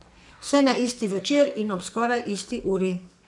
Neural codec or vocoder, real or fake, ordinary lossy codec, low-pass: codec, 44.1 kHz, 2.6 kbps, SNAC; fake; none; 10.8 kHz